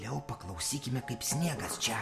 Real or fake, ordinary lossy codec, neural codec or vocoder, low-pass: real; Opus, 64 kbps; none; 14.4 kHz